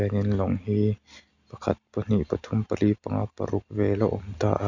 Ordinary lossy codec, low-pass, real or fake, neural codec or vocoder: none; 7.2 kHz; real; none